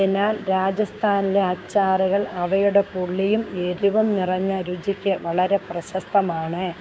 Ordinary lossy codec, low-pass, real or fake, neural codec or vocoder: none; none; fake; codec, 16 kHz, 6 kbps, DAC